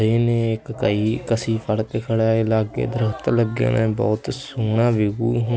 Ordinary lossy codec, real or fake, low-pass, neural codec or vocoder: none; real; none; none